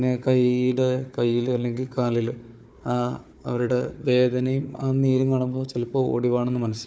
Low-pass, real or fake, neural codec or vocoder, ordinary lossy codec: none; fake; codec, 16 kHz, 4 kbps, FunCodec, trained on Chinese and English, 50 frames a second; none